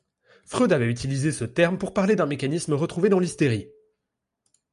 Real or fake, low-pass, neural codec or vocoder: real; 10.8 kHz; none